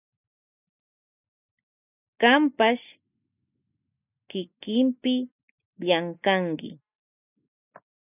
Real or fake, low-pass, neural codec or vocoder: real; 3.6 kHz; none